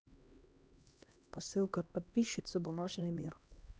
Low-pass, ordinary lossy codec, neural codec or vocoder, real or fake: none; none; codec, 16 kHz, 1 kbps, X-Codec, HuBERT features, trained on LibriSpeech; fake